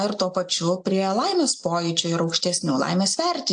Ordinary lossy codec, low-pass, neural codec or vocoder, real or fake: AAC, 64 kbps; 9.9 kHz; none; real